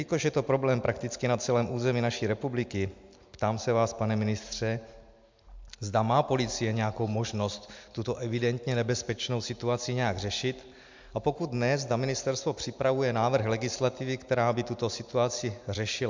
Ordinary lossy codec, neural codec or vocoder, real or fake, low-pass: MP3, 64 kbps; none; real; 7.2 kHz